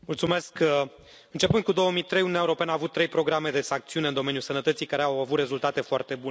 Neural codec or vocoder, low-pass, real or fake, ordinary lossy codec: none; none; real; none